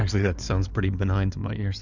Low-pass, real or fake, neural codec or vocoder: 7.2 kHz; fake; codec, 16 kHz in and 24 kHz out, 2.2 kbps, FireRedTTS-2 codec